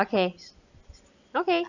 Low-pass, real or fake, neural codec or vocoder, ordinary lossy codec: 7.2 kHz; real; none; none